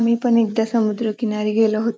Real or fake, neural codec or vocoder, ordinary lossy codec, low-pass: real; none; none; none